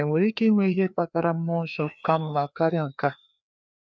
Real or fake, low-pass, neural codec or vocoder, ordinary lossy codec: fake; none; codec, 16 kHz, 2 kbps, FreqCodec, larger model; none